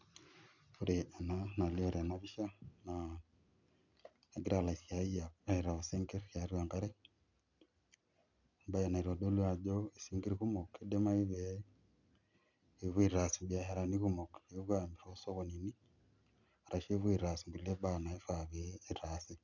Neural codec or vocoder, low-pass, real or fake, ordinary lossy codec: none; 7.2 kHz; real; none